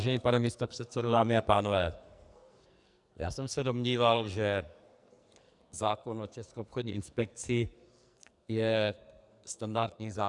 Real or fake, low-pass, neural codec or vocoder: fake; 10.8 kHz; codec, 44.1 kHz, 2.6 kbps, SNAC